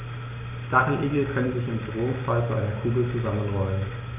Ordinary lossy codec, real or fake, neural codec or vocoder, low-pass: none; real; none; 3.6 kHz